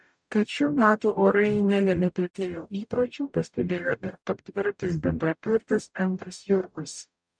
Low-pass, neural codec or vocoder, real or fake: 9.9 kHz; codec, 44.1 kHz, 0.9 kbps, DAC; fake